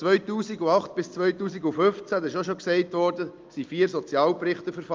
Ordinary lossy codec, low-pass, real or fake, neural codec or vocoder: Opus, 24 kbps; 7.2 kHz; real; none